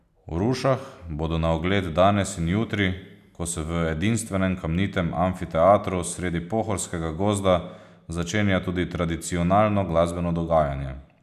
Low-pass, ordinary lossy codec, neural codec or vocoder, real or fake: 14.4 kHz; none; none; real